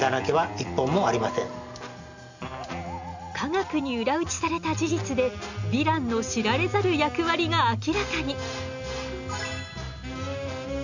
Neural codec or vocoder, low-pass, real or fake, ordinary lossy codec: none; 7.2 kHz; real; none